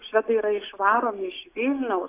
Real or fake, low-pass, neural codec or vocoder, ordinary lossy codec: real; 3.6 kHz; none; AAC, 24 kbps